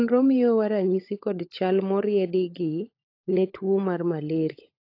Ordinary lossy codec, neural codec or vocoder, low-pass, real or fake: AAC, 32 kbps; codec, 16 kHz, 16 kbps, FunCodec, trained on LibriTTS, 50 frames a second; 5.4 kHz; fake